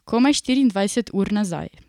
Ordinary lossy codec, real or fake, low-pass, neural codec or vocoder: none; real; 19.8 kHz; none